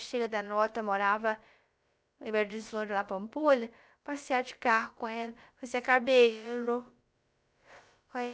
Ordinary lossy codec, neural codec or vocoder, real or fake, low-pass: none; codec, 16 kHz, about 1 kbps, DyCAST, with the encoder's durations; fake; none